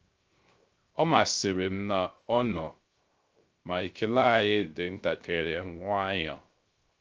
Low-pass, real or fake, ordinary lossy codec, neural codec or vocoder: 7.2 kHz; fake; Opus, 24 kbps; codec, 16 kHz, 0.3 kbps, FocalCodec